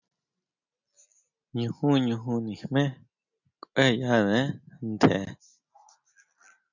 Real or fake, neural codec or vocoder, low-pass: real; none; 7.2 kHz